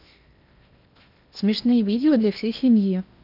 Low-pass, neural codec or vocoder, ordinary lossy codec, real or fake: 5.4 kHz; codec, 16 kHz in and 24 kHz out, 0.8 kbps, FocalCodec, streaming, 65536 codes; none; fake